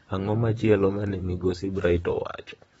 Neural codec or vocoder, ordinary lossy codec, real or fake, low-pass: vocoder, 44.1 kHz, 128 mel bands, Pupu-Vocoder; AAC, 24 kbps; fake; 19.8 kHz